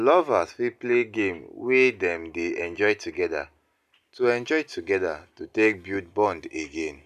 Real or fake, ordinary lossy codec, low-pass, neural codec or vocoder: real; none; 14.4 kHz; none